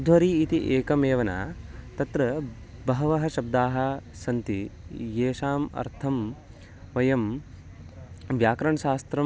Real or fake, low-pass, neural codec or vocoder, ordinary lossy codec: real; none; none; none